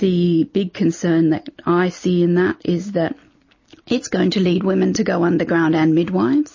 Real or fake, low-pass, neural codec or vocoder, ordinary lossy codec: real; 7.2 kHz; none; MP3, 32 kbps